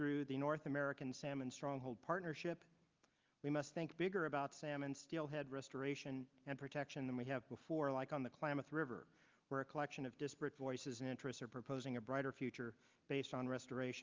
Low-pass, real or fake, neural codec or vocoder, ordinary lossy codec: 7.2 kHz; real; none; Opus, 32 kbps